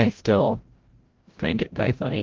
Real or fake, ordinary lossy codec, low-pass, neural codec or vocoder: fake; Opus, 16 kbps; 7.2 kHz; codec, 16 kHz, 0.5 kbps, FreqCodec, larger model